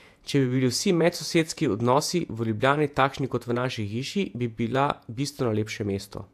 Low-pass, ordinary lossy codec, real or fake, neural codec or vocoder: 14.4 kHz; AAC, 96 kbps; fake; vocoder, 48 kHz, 128 mel bands, Vocos